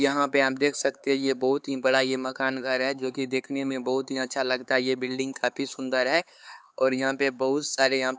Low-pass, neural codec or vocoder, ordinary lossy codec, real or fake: none; codec, 16 kHz, 4 kbps, X-Codec, HuBERT features, trained on LibriSpeech; none; fake